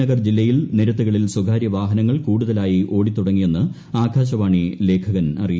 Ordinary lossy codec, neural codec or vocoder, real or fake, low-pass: none; none; real; none